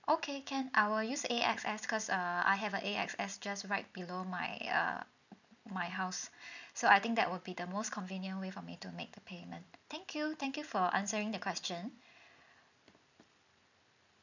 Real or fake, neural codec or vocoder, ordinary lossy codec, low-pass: real; none; none; 7.2 kHz